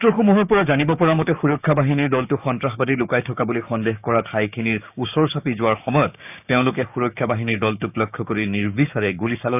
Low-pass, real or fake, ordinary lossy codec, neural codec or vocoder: 3.6 kHz; fake; none; codec, 16 kHz, 6 kbps, DAC